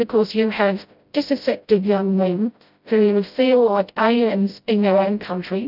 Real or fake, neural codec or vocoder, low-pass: fake; codec, 16 kHz, 0.5 kbps, FreqCodec, smaller model; 5.4 kHz